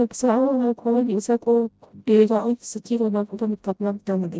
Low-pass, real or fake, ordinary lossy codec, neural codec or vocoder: none; fake; none; codec, 16 kHz, 0.5 kbps, FreqCodec, smaller model